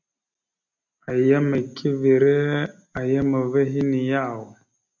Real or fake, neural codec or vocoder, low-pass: real; none; 7.2 kHz